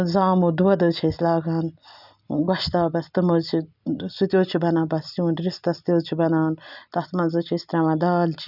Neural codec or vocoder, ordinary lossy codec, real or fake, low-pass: none; none; real; 5.4 kHz